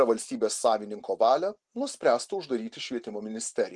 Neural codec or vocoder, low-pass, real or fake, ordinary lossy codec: none; 10.8 kHz; real; Opus, 24 kbps